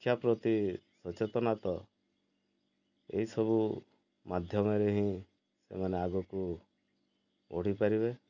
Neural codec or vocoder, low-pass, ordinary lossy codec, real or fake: none; 7.2 kHz; none; real